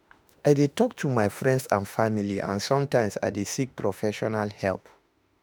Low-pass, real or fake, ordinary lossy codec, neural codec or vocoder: none; fake; none; autoencoder, 48 kHz, 32 numbers a frame, DAC-VAE, trained on Japanese speech